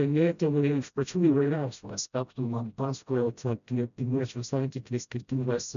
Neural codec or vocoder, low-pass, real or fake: codec, 16 kHz, 0.5 kbps, FreqCodec, smaller model; 7.2 kHz; fake